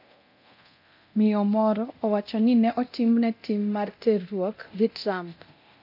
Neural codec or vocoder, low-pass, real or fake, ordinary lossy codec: codec, 24 kHz, 0.9 kbps, DualCodec; 5.4 kHz; fake; none